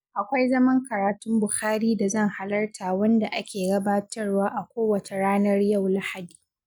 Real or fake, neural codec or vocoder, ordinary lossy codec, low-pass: real; none; none; 19.8 kHz